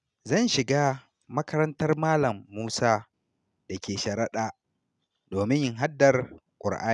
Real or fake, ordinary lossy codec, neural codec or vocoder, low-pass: real; none; none; 10.8 kHz